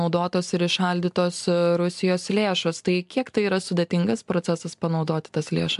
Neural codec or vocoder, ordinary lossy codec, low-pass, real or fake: none; AAC, 96 kbps; 10.8 kHz; real